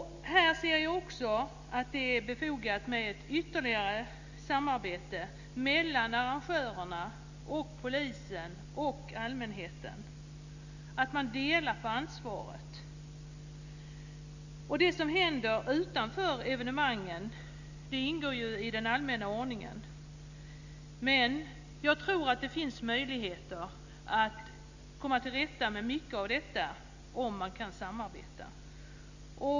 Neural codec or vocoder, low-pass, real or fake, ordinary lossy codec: none; 7.2 kHz; real; Opus, 64 kbps